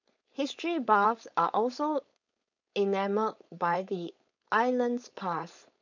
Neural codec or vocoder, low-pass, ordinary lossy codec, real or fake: codec, 16 kHz, 4.8 kbps, FACodec; 7.2 kHz; none; fake